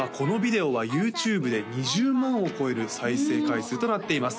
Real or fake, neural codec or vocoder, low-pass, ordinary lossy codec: real; none; none; none